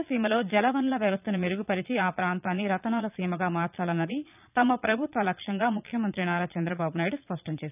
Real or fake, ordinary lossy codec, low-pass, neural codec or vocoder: fake; none; 3.6 kHz; vocoder, 22.05 kHz, 80 mel bands, Vocos